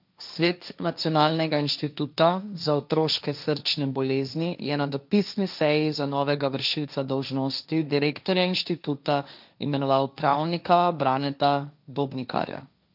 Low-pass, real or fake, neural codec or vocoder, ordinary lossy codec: 5.4 kHz; fake; codec, 16 kHz, 1.1 kbps, Voila-Tokenizer; none